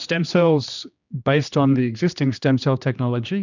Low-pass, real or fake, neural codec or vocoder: 7.2 kHz; fake; codec, 16 kHz, 2 kbps, X-Codec, HuBERT features, trained on general audio